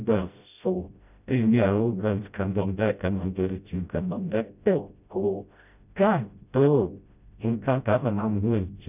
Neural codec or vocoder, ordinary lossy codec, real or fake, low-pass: codec, 16 kHz, 0.5 kbps, FreqCodec, smaller model; none; fake; 3.6 kHz